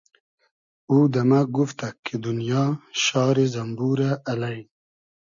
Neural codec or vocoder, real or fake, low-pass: none; real; 7.2 kHz